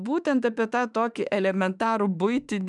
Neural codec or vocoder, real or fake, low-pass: autoencoder, 48 kHz, 32 numbers a frame, DAC-VAE, trained on Japanese speech; fake; 10.8 kHz